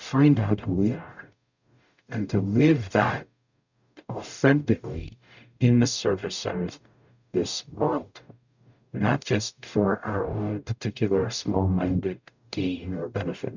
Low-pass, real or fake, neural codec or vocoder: 7.2 kHz; fake; codec, 44.1 kHz, 0.9 kbps, DAC